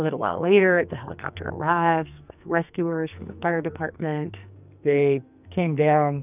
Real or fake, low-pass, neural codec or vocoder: fake; 3.6 kHz; codec, 44.1 kHz, 2.6 kbps, SNAC